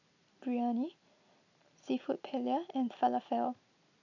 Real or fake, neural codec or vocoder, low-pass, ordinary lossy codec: real; none; 7.2 kHz; none